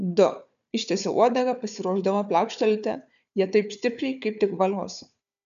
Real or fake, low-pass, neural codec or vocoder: fake; 7.2 kHz; codec, 16 kHz, 4 kbps, FunCodec, trained on Chinese and English, 50 frames a second